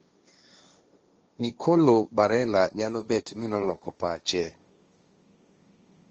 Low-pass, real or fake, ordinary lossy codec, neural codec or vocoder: 7.2 kHz; fake; Opus, 24 kbps; codec, 16 kHz, 1.1 kbps, Voila-Tokenizer